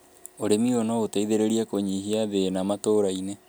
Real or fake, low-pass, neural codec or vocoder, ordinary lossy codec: real; none; none; none